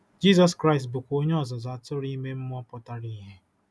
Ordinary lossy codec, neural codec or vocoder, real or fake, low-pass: none; none; real; none